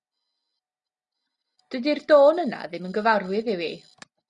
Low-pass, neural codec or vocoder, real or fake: 10.8 kHz; none; real